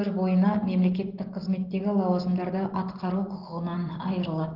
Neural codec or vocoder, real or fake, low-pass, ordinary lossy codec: none; real; 5.4 kHz; Opus, 16 kbps